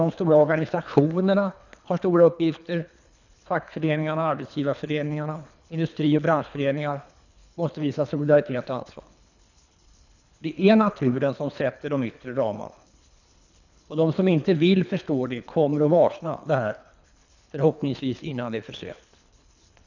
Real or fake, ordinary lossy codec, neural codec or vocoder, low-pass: fake; none; codec, 24 kHz, 3 kbps, HILCodec; 7.2 kHz